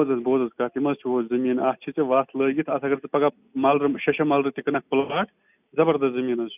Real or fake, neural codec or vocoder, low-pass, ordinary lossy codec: real; none; 3.6 kHz; none